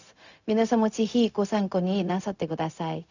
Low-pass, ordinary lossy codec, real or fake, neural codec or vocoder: 7.2 kHz; none; fake; codec, 16 kHz, 0.4 kbps, LongCat-Audio-Codec